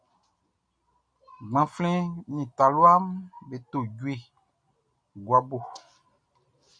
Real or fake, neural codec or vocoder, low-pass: real; none; 9.9 kHz